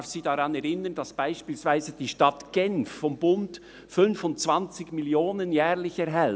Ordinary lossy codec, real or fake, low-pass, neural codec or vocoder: none; real; none; none